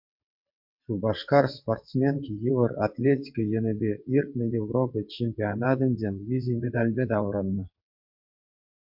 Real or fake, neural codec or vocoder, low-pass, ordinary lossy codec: fake; vocoder, 22.05 kHz, 80 mel bands, WaveNeXt; 5.4 kHz; MP3, 48 kbps